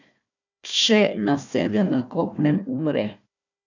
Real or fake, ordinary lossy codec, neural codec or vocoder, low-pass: fake; none; codec, 16 kHz, 1 kbps, FunCodec, trained on Chinese and English, 50 frames a second; 7.2 kHz